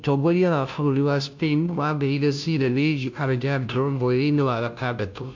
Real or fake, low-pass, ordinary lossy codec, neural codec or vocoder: fake; 7.2 kHz; MP3, 64 kbps; codec, 16 kHz, 0.5 kbps, FunCodec, trained on Chinese and English, 25 frames a second